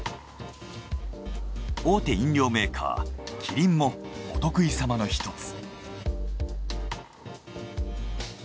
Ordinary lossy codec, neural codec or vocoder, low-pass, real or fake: none; none; none; real